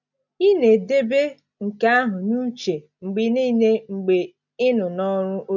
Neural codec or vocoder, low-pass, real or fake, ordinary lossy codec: none; 7.2 kHz; real; none